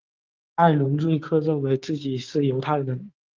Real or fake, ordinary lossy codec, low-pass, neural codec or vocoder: fake; Opus, 24 kbps; 7.2 kHz; codec, 16 kHz, 6 kbps, DAC